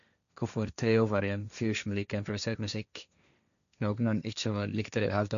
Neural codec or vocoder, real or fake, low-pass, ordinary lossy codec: codec, 16 kHz, 1.1 kbps, Voila-Tokenizer; fake; 7.2 kHz; none